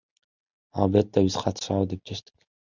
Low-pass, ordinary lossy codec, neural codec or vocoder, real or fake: 7.2 kHz; Opus, 64 kbps; none; real